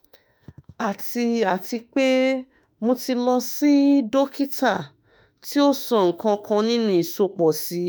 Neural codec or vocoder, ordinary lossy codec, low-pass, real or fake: autoencoder, 48 kHz, 32 numbers a frame, DAC-VAE, trained on Japanese speech; none; none; fake